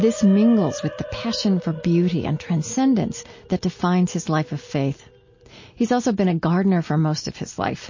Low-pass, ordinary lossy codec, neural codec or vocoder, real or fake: 7.2 kHz; MP3, 32 kbps; none; real